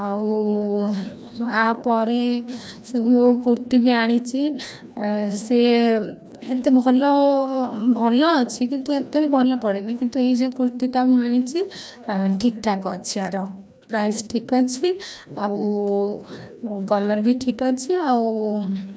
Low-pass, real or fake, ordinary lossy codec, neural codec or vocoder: none; fake; none; codec, 16 kHz, 1 kbps, FreqCodec, larger model